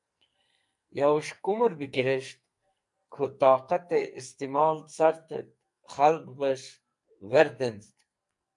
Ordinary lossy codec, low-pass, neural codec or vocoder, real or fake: MP3, 64 kbps; 10.8 kHz; codec, 44.1 kHz, 2.6 kbps, SNAC; fake